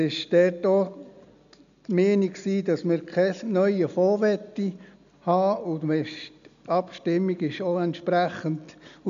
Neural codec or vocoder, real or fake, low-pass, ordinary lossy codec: none; real; 7.2 kHz; none